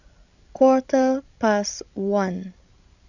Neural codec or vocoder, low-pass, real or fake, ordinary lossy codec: codec, 16 kHz, 16 kbps, FreqCodec, larger model; 7.2 kHz; fake; none